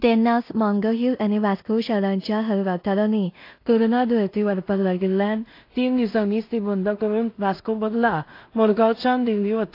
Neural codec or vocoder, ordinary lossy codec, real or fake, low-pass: codec, 16 kHz in and 24 kHz out, 0.4 kbps, LongCat-Audio-Codec, two codebook decoder; AAC, 32 kbps; fake; 5.4 kHz